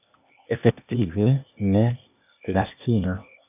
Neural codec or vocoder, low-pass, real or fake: codec, 16 kHz, 0.8 kbps, ZipCodec; 3.6 kHz; fake